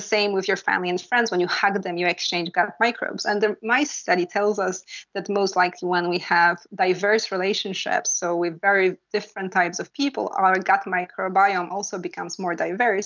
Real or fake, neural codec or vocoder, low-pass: real; none; 7.2 kHz